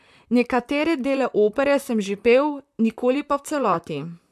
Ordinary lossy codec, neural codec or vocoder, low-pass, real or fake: none; vocoder, 44.1 kHz, 128 mel bands, Pupu-Vocoder; 14.4 kHz; fake